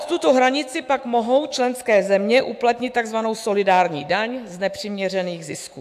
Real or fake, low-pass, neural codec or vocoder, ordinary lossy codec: fake; 14.4 kHz; autoencoder, 48 kHz, 128 numbers a frame, DAC-VAE, trained on Japanese speech; AAC, 96 kbps